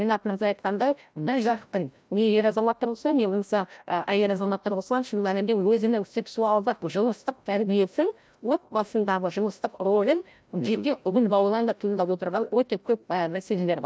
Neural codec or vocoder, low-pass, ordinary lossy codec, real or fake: codec, 16 kHz, 0.5 kbps, FreqCodec, larger model; none; none; fake